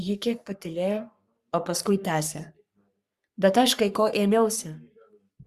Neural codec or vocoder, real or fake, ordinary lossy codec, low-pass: codec, 44.1 kHz, 3.4 kbps, Pupu-Codec; fake; Opus, 64 kbps; 14.4 kHz